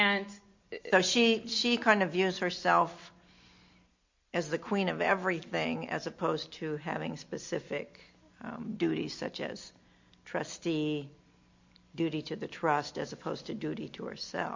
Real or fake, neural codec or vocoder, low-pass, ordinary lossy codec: real; none; 7.2 kHz; MP3, 48 kbps